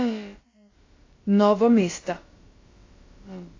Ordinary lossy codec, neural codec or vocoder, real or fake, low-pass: AAC, 32 kbps; codec, 16 kHz, about 1 kbps, DyCAST, with the encoder's durations; fake; 7.2 kHz